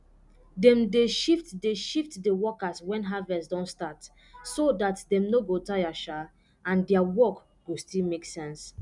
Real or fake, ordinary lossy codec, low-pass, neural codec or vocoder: real; none; 10.8 kHz; none